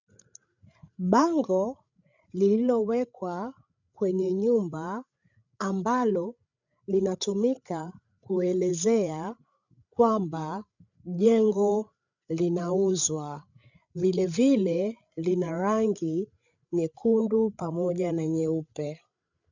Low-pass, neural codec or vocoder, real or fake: 7.2 kHz; codec, 16 kHz, 8 kbps, FreqCodec, larger model; fake